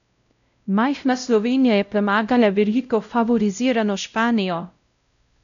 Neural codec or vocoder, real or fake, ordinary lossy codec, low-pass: codec, 16 kHz, 0.5 kbps, X-Codec, WavLM features, trained on Multilingual LibriSpeech; fake; none; 7.2 kHz